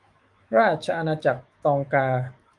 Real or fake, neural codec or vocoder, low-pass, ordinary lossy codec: fake; autoencoder, 48 kHz, 128 numbers a frame, DAC-VAE, trained on Japanese speech; 10.8 kHz; Opus, 32 kbps